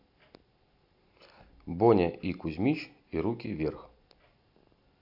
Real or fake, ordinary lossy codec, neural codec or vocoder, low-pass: real; AAC, 48 kbps; none; 5.4 kHz